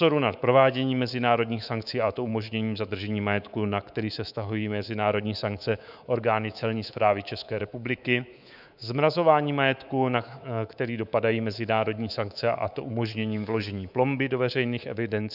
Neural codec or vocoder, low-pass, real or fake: codec, 24 kHz, 3.1 kbps, DualCodec; 5.4 kHz; fake